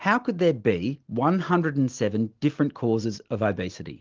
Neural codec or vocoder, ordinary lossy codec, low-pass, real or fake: none; Opus, 16 kbps; 7.2 kHz; real